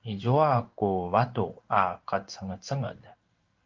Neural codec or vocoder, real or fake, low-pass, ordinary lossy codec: codec, 16 kHz in and 24 kHz out, 1 kbps, XY-Tokenizer; fake; 7.2 kHz; Opus, 32 kbps